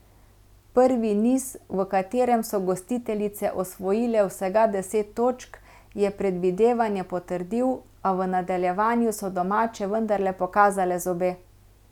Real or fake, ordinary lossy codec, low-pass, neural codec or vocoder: real; none; 19.8 kHz; none